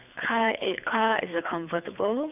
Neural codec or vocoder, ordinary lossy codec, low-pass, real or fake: codec, 24 kHz, 3 kbps, HILCodec; none; 3.6 kHz; fake